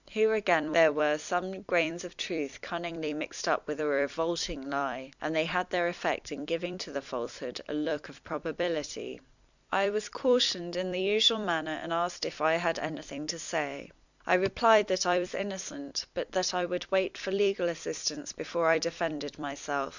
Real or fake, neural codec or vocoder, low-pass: fake; vocoder, 44.1 kHz, 128 mel bands every 256 samples, BigVGAN v2; 7.2 kHz